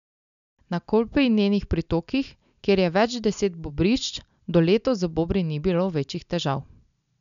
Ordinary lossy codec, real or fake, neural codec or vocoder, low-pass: none; real; none; 7.2 kHz